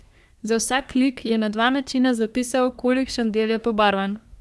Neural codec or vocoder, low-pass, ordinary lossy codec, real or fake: codec, 24 kHz, 1 kbps, SNAC; none; none; fake